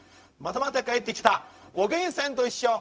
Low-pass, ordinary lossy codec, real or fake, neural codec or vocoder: none; none; fake; codec, 16 kHz, 0.4 kbps, LongCat-Audio-Codec